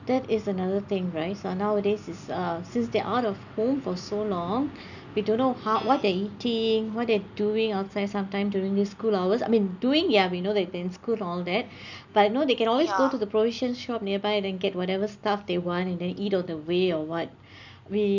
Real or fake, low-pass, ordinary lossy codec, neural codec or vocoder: real; 7.2 kHz; none; none